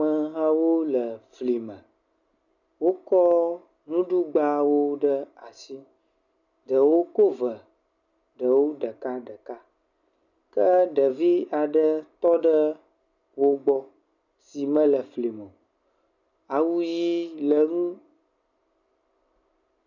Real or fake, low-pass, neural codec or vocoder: real; 7.2 kHz; none